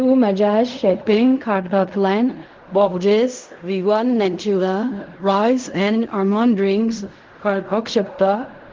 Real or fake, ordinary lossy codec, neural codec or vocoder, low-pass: fake; Opus, 32 kbps; codec, 16 kHz in and 24 kHz out, 0.4 kbps, LongCat-Audio-Codec, fine tuned four codebook decoder; 7.2 kHz